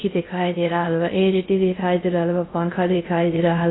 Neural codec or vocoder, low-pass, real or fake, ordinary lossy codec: codec, 16 kHz in and 24 kHz out, 0.6 kbps, FocalCodec, streaming, 2048 codes; 7.2 kHz; fake; AAC, 16 kbps